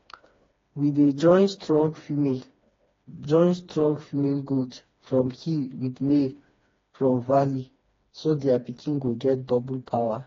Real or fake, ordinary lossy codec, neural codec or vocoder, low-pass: fake; AAC, 32 kbps; codec, 16 kHz, 2 kbps, FreqCodec, smaller model; 7.2 kHz